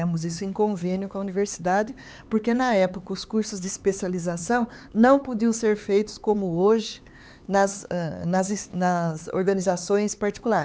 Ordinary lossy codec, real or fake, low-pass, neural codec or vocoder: none; fake; none; codec, 16 kHz, 4 kbps, X-Codec, HuBERT features, trained on LibriSpeech